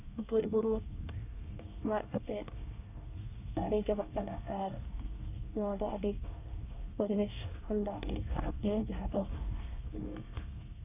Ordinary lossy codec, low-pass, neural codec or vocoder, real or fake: none; 3.6 kHz; codec, 24 kHz, 1 kbps, SNAC; fake